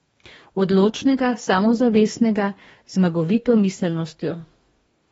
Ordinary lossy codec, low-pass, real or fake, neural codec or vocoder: AAC, 24 kbps; 19.8 kHz; fake; codec, 44.1 kHz, 2.6 kbps, DAC